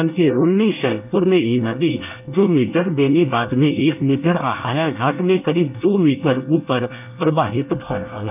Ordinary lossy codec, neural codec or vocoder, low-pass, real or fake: none; codec, 24 kHz, 1 kbps, SNAC; 3.6 kHz; fake